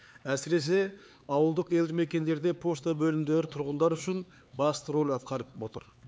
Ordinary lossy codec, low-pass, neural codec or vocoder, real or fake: none; none; codec, 16 kHz, 4 kbps, X-Codec, HuBERT features, trained on LibriSpeech; fake